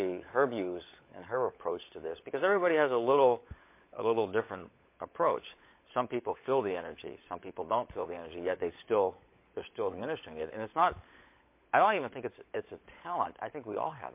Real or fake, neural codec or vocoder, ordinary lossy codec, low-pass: fake; codec, 16 kHz, 16 kbps, FunCodec, trained on LibriTTS, 50 frames a second; MP3, 24 kbps; 3.6 kHz